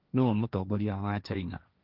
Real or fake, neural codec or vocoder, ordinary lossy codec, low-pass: fake; codec, 16 kHz, 1.1 kbps, Voila-Tokenizer; Opus, 24 kbps; 5.4 kHz